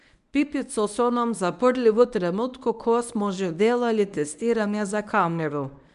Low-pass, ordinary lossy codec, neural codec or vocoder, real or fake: 10.8 kHz; none; codec, 24 kHz, 0.9 kbps, WavTokenizer, medium speech release version 1; fake